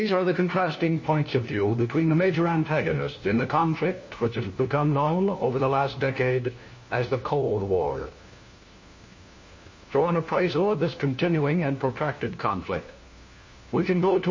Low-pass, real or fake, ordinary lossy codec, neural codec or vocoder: 7.2 kHz; fake; MP3, 32 kbps; codec, 16 kHz, 1 kbps, FunCodec, trained on LibriTTS, 50 frames a second